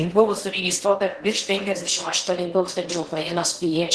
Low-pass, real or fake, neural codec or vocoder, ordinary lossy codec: 10.8 kHz; fake; codec, 16 kHz in and 24 kHz out, 0.6 kbps, FocalCodec, streaming, 4096 codes; Opus, 16 kbps